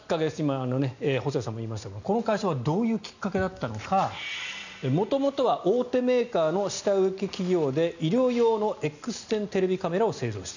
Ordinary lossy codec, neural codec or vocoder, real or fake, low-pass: none; none; real; 7.2 kHz